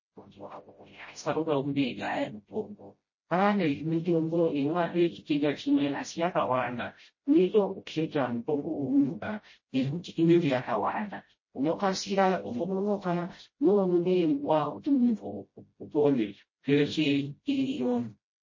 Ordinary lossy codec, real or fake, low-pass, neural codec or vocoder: MP3, 32 kbps; fake; 7.2 kHz; codec, 16 kHz, 0.5 kbps, FreqCodec, smaller model